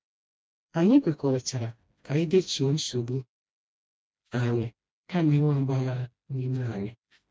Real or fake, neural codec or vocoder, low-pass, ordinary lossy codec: fake; codec, 16 kHz, 1 kbps, FreqCodec, smaller model; none; none